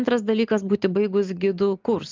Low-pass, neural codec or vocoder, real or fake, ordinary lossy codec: 7.2 kHz; none; real; Opus, 32 kbps